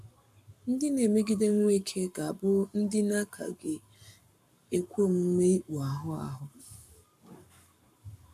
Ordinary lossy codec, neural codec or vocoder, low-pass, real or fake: none; codec, 44.1 kHz, 7.8 kbps, Pupu-Codec; 14.4 kHz; fake